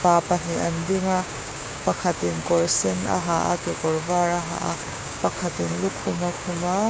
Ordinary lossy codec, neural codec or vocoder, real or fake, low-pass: none; codec, 16 kHz, 6 kbps, DAC; fake; none